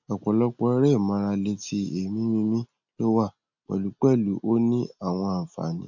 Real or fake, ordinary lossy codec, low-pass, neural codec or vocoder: real; none; 7.2 kHz; none